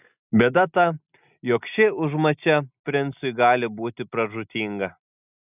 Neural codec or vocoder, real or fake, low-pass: none; real; 3.6 kHz